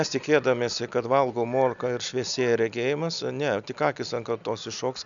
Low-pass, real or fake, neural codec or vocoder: 7.2 kHz; real; none